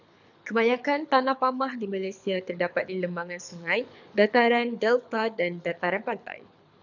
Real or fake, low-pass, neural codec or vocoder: fake; 7.2 kHz; codec, 24 kHz, 6 kbps, HILCodec